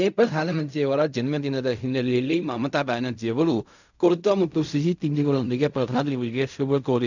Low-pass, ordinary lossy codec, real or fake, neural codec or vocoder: 7.2 kHz; none; fake; codec, 16 kHz in and 24 kHz out, 0.4 kbps, LongCat-Audio-Codec, fine tuned four codebook decoder